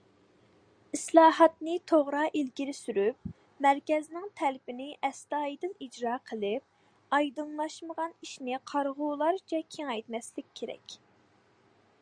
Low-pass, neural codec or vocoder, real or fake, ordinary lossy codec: 9.9 kHz; none; real; Opus, 64 kbps